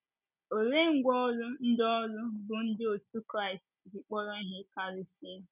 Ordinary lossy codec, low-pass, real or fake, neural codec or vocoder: none; 3.6 kHz; real; none